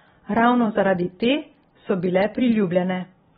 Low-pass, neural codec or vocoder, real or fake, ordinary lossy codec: 19.8 kHz; vocoder, 44.1 kHz, 128 mel bands every 256 samples, BigVGAN v2; fake; AAC, 16 kbps